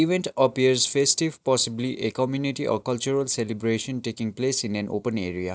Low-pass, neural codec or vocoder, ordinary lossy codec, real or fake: none; none; none; real